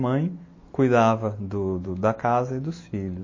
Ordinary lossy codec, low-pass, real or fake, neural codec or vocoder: MP3, 32 kbps; 7.2 kHz; real; none